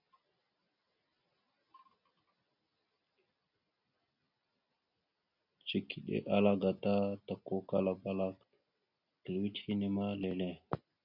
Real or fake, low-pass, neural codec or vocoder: real; 5.4 kHz; none